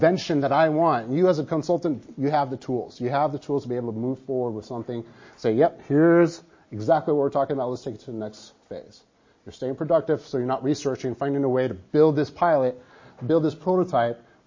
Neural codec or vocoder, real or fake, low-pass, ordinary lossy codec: none; real; 7.2 kHz; MP3, 32 kbps